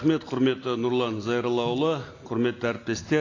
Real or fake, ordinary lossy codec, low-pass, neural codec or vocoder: real; AAC, 32 kbps; 7.2 kHz; none